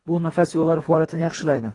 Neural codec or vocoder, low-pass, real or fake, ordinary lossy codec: codec, 24 kHz, 1.5 kbps, HILCodec; 10.8 kHz; fake; AAC, 32 kbps